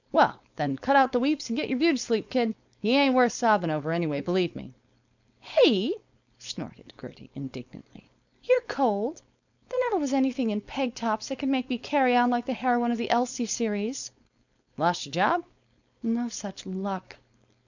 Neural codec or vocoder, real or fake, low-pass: codec, 16 kHz, 4.8 kbps, FACodec; fake; 7.2 kHz